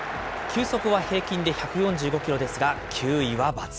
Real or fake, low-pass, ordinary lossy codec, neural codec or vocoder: real; none; none; none